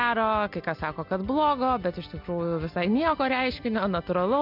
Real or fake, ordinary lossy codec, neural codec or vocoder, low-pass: real; MP3, 48 kbps; none; 5.4 kHz